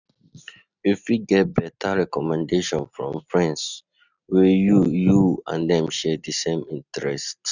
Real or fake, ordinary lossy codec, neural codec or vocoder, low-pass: real; none; none; 7.2 kHz